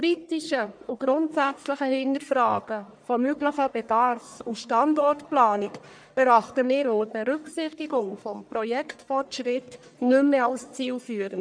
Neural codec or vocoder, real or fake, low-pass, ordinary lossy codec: codec, 44.1 kHz, 1.7 kbps, Pupu-Codec; fake; 9.9 kHz; none